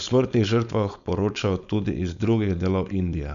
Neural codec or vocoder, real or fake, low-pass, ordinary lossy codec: codec, 16 kHz, 4.8 kbps, FACodec; fake; 7.2 kHz; none